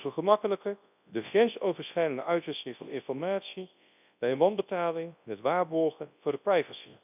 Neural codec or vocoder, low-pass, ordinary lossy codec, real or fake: codec, 24 kHz, 0.9 kbps, WavTokenizer, large speech release; 3.6 kHz; none; fake